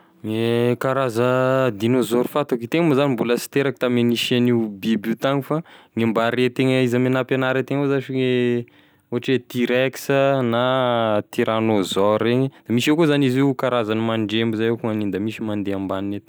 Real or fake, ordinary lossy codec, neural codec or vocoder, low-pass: real; none; none; none